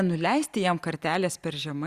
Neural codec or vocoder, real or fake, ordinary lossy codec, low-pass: none; real; Opus, 64 kbps; 14.4 kHz